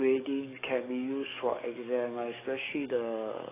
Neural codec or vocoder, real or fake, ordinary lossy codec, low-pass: codec, 44.1 kHz, 7.8 kbps, DAC; fake; AAC, 16 kbps; 3.6 kHz